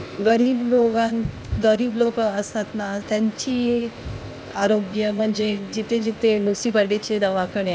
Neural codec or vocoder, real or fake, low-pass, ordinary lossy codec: codec, 16 kHz, 0.8 kbps, ZipCodec; fake; none; none